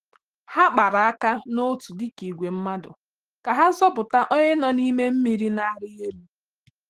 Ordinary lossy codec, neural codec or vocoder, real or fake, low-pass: Opus, 16 kbps; autoencoder, 48 kHz, 128 numbers a frame, DAC-VAE, trained on Japanese speech; fake; 14.4 kHz